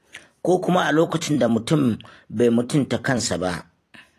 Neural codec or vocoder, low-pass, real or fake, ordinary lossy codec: vocoder, 44.1 kHz, 128 mel bands every 256 samples, BigVGAN v2; 14.4 kHz; fake; AAC, 48 kbps